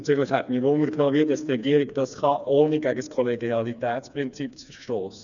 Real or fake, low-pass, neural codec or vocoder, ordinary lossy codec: fake; 7.2 kHz; codec, 16 kHz, 2 kbps, FreqCodec, smaller model; none